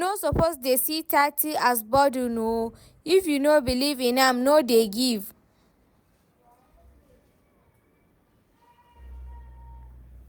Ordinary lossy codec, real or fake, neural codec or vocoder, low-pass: none; real; none; none